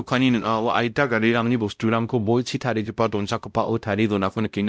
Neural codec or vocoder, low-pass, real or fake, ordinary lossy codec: codec, 16 kHz, 0.5 kbps, X-Codec, WavLM features, trained on Multilingual LibriSpeech; none; fake; none